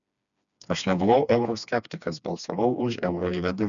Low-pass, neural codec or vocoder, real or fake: 7.2 kHz; codec, 16 kHz, 2 kbps, FreqCodec, smaller model; fake